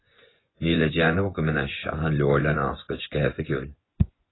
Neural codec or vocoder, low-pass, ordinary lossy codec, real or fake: vocoder, 24 kHz, 100 mel bands, Vocos; 7.2 kHz; AAC, 16 kbps; fake